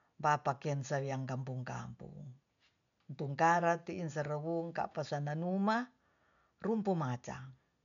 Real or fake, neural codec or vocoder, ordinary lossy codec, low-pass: real; none; none; 7.2 kHz